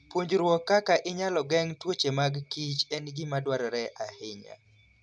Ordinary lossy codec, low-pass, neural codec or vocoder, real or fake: none; none; none; real